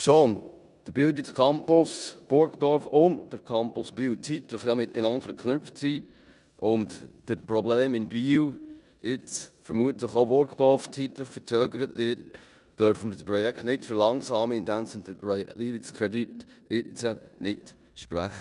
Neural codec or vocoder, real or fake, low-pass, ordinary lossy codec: codec, 16 kHz in and 24 kHz out, 0.9 kbps, LongCat-Audio-Codec, four codebook decoder; fake; 10.8 kHz; none